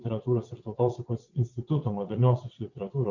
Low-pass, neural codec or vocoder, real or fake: 7.2 kHz; vocoder, 22.05 kHz, 80 mel bands, WaveNeXt; fake